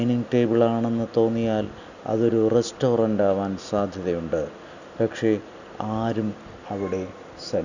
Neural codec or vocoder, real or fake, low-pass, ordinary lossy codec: none; real; 7.2 kHz; none